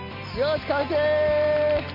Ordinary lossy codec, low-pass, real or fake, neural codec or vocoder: none; 5.4 kHz; real; none